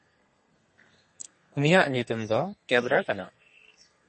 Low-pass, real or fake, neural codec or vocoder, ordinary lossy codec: 10.8 kHz; fake; codec, 32 kHz, 1.9 kbps, SNAC; MP3, 32 kbps